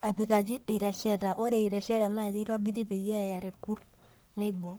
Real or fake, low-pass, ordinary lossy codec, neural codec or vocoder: fake; none; none; codec, 44.1 kHz, 1.7 kbps, Pupu-Codec